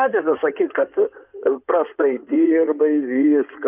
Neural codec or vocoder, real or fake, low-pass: codec, 16 kHz in and 24 kHz out, 2.2 kbps, FireRedTTS-2 codec; fake; 3.6 kHz